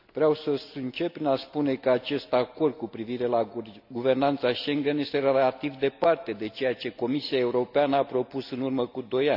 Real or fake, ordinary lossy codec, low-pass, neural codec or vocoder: real; none; 5.4 kHz; none